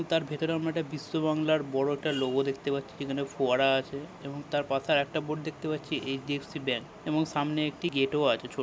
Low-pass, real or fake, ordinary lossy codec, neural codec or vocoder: none; real; none; none